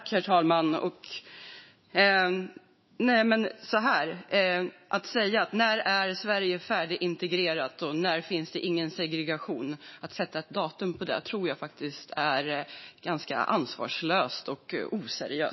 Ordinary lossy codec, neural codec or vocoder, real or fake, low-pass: MP3, 24 kbps; autoencoder, 48 kHz, 128 numbers a frame, DAC-VAE, trained on Japanese speech; fake; 7.2 kHz